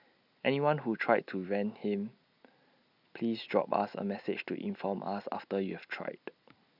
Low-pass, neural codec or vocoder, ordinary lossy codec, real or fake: 5.4 kHz; none; none; real